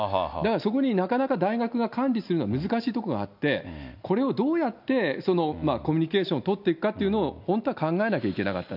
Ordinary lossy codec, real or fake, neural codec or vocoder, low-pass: none; real; none; 5.4 kHz